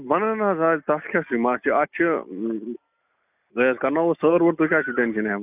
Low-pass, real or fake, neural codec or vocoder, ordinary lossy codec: 3.6 kHz; real; none; none